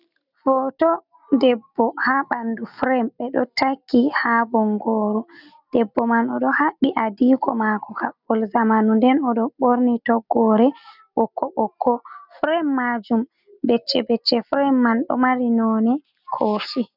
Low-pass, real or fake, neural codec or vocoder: 5.4 kHz; real; none